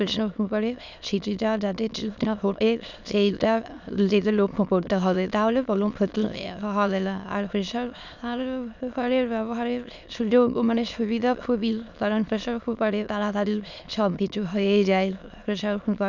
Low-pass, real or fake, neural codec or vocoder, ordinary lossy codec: 7.2 kHz; fake; autoencoder, 22.05 kHz, a latent of 192 numbers a frame, VITS, trained on many speakers; none